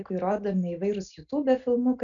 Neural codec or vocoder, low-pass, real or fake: none; 7.2 kHz; real